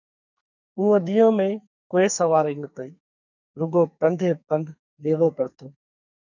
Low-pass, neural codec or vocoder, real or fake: 7.2 kHz; codec, 44.1 kHz, 2.6 kbps, SNAC; fake